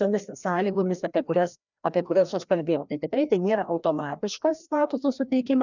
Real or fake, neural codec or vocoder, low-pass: fake; codec, 16 kHz, 1 kbps, FreqCodec, larger model; 7.2 kHz